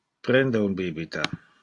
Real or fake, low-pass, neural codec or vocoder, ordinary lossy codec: real; 10.8 kHz; none; AAC, 48 kbps